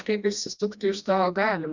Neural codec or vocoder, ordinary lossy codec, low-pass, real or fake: codec, 16 kHz, 1 kbps, FreqCodec, smaller model; Opus, 64 kbps; 7.2 kHz; fake